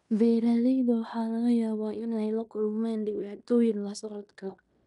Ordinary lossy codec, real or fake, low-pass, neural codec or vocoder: none; fake; 10.8 kHz; codec, 16 kHz in and 24 kHz out, 0.9 kbps, LongCat-Audio-Codec, fine tuned four codebook decoder